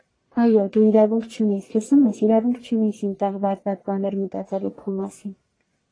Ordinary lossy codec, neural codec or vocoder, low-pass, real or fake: AAC, 32 kbps; codec, 44.1 kHz, 1.7 kbps, Pupu-Codec; 9.9 kHz; fake